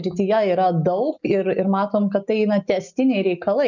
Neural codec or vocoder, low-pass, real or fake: none; 7.2 kHz; real